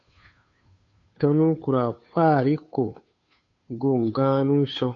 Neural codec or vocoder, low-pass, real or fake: codec, 16 kHz, 2 kbps, FunCodec, trained on Chinese and English, 25 frames a second; 7.2 kHz; fake